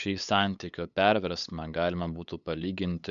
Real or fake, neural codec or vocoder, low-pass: fake; codec, 16 kHz, 8 kbps, FunCodec, trained on LibriTTS, 25 frames a second; 7.2 kHz